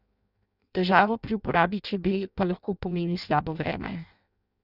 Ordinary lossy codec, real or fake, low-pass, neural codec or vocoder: none; fake; 5.4 kHz; codec, 16 kHz in and 24 kHz out, 0.6 kbps, FireRedTTS-2 codec